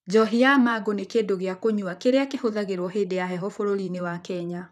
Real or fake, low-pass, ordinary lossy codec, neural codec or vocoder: fake; 14.4 kHz; none; vocoder, 44.1 kHz, 128 mel bands, Pupu-Vocoder